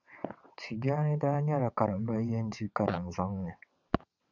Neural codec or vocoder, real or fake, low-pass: vocoder, 22.05 kHz, 80 mel bands, WaveNeXt; fake; 7.2 kHz